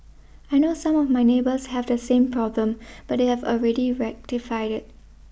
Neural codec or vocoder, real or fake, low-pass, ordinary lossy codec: none; real; none; none